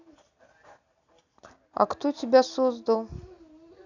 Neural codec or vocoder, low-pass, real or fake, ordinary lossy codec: none; 7.2 kHz; real; none